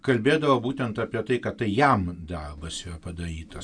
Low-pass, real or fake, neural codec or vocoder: 9.9 kHz; real; none